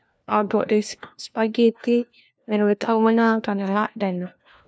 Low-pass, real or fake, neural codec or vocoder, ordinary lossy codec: none; fake; codec, 16 kHz, 1 kbps, FunCodec, trained on LibriTTS, 50 frames a second; none